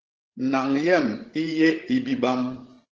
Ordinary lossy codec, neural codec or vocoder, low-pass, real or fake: Opus, 16 kbps; codec, 16 kHz, 16 kbps, FreqCodec, larger model; 7.2 kHz; fake